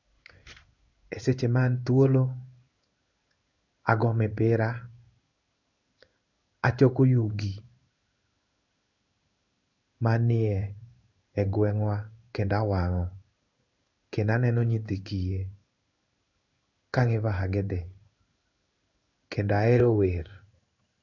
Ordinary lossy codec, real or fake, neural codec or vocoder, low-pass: none; fake; codec, 16 kHz in and 24 kHz out, 1 kbps, XY-Tokenizer; 7.2 kHz